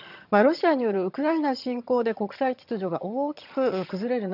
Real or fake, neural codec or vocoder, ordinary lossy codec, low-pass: fake; vocoder, 22.05 kHz, 80 mel bands, HiFi-GAN; none; 5.4 kHz